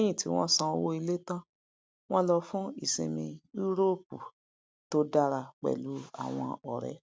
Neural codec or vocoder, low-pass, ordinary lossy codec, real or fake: none; none; none; real